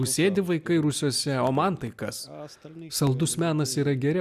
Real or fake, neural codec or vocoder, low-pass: real; none; 14.4 kHz